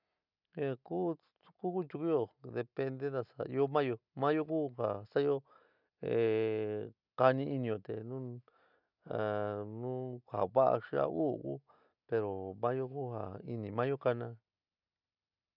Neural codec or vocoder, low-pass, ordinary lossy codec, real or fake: none; 5.4 kHz; none; real